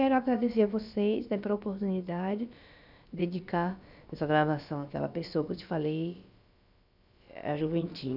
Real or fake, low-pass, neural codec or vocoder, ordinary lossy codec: fake; 5.4 kHz; codec, 16 kHz, about 1 kbps, DyCAST, with the encoder's durations; none